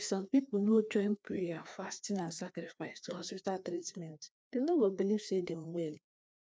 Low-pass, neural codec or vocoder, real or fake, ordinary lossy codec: none; codec, 16 kHz, 2 kbps, FreqCodec, larger model; fake; none